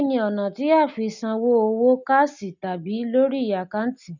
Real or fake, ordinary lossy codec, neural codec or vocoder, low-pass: real; none; none; 7.2 kHz